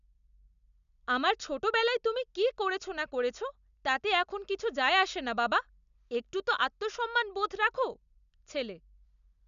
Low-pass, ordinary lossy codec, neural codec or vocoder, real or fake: 7.2 kHz; none; none; real